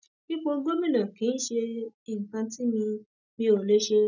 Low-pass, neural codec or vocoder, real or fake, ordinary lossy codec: 7.2 kHz; none; real; none